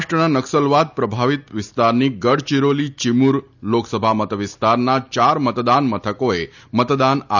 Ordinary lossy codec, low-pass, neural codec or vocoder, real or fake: none; 7.2 kHz; none; real